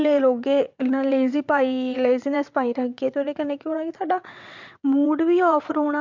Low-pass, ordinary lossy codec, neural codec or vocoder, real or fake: 7.2 kHz; MP3, 64 kbps; vocoder, 22.05 kHz, 80 mel bands, WaveNeXt; fake